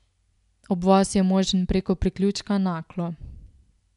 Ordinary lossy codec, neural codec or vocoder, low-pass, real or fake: none; none; 10.8 kHz; real